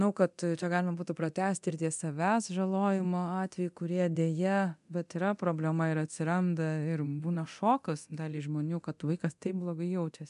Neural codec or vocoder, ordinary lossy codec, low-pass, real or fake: codec, 24 kHz, 0.9 kbps, DualCodec; MP3, 96 kbps; 10.8 kHz; fake